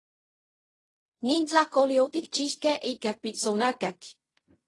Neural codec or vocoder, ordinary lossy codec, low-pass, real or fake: codec, 16 kHz in and 24 kHz out, 0.4 kbps, LongCat-Audio-Codec, fine tuned four codebook decoder; AAC, 32 kbps; 10.8 kHz; fake